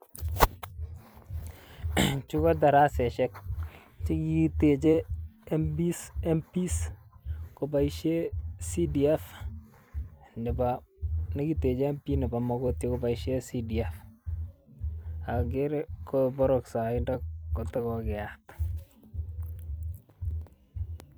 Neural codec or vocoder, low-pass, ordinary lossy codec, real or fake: vocoder, 44.1 kHz, 128 mel bands every 256 samples, BigVGAN v2; none; none; fake